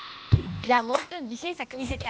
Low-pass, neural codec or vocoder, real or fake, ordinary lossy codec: none; codec, 16 kHz, 0.8 kbps, ZipCodec; fake; none